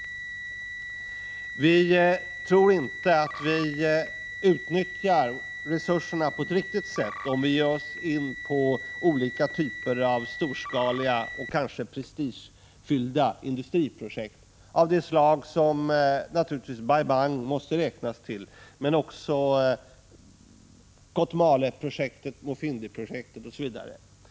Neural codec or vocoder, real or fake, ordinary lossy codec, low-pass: none; real; none; none